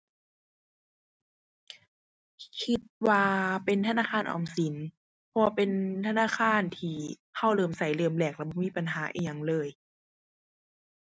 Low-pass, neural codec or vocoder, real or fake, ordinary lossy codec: none; none; real; none